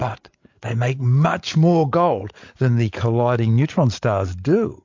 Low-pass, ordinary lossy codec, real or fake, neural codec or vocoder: 7.2 kHz; MP3, 48 kbps; fake; codec, 16 kHz, 8 kbps, FreqCodec, larger model